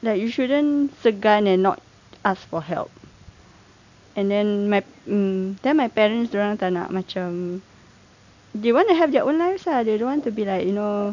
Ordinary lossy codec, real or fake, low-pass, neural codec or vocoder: none; real; 7.2 kHz; none